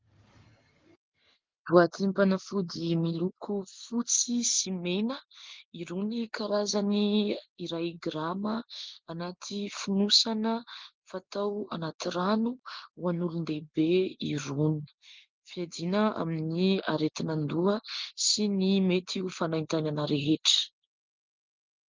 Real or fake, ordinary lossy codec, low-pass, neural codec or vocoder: real; Opus, 32 kbps; 7.2 kHz; none